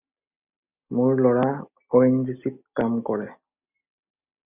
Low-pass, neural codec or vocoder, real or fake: 3.6 kHz; none; real